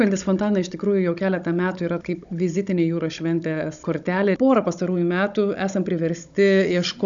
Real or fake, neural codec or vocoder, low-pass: fake; codec, 16 kHz, 16 kbps, FunCodec, trained on Chinese and English, 50 frames a second; 7.2 kHz